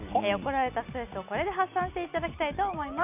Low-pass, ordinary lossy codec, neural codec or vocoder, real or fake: 3.6 kHz; none; none; real